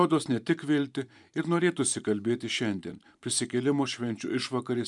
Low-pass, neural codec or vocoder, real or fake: 10.8 kHz; vocoder, 44.1 kHz, 128 mel bands every 512 samples, BigVGAN v2; fake